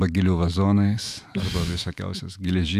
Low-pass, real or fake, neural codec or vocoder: 14.4 kHz; fake; autoencoder, 48 kHz, 128 numbers a frame, DAC-VAE, trained on Japanese speech